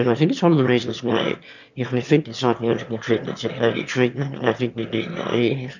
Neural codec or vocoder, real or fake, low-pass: autoencoder, 22.05 kHz, a latent of 192 numbers a frame, VITS, trained on one speaker; fake; 7.2 kHz